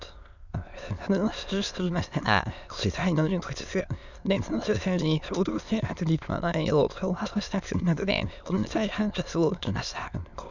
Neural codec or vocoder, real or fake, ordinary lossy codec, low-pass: autoencoder, 22.05 kHz, a latent of 192 numbers a frame, VITS, trained on many speakers; fake; none; 7.2 kHz